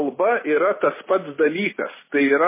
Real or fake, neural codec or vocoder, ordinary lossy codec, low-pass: real; none; MP3, 16 kbps; 3.6 kHz